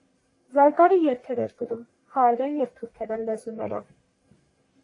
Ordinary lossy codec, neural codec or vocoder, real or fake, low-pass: AAC, 48 kbps; codec, 44.1 kHz, 1.7 kbps, Pupu-Codec; fake; 10.8 kHz